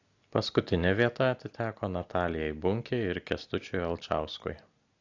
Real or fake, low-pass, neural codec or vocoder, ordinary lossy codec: real; 7.2 kHz; none; AAC, 48 kbps